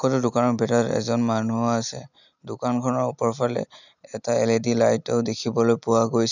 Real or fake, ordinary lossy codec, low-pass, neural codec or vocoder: real; none; 7.2 kHz; none